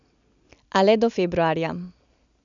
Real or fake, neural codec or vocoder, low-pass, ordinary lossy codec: real; none; 7.2 kHz; none